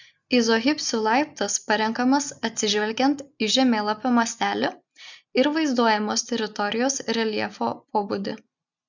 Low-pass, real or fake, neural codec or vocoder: 7.2 kHz; real; none